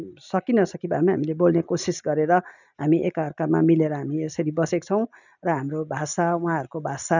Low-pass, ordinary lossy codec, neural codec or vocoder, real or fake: 7.2 kHz; none; none; real